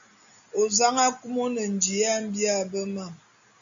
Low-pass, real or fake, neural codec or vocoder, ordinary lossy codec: 7.2 kHz; real; none; MP3, 64 kbps